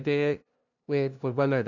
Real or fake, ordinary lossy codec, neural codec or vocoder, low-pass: fake; none; codec, 16 kHz, 0.5 kbps, FunCodec, trained on LibriTTS, 25 frames a second; 7.2 kHz